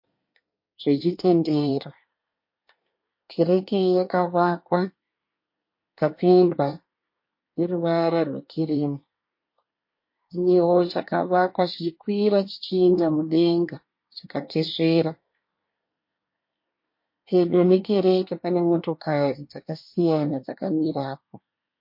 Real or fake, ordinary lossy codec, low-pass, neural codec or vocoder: fake; MP3, 32 kbps; 5.4 kHz; codec, 24 kHz, 1 kbps, SNAC